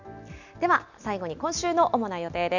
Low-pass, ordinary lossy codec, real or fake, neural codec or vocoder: 7.2 kHz; none; real; none